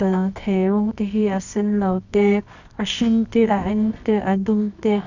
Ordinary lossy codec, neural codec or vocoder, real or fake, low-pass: none; codec, 24 kHz, 0.9 kbps, WavTokenizer, medium music audio release; fake; 7.2 kHz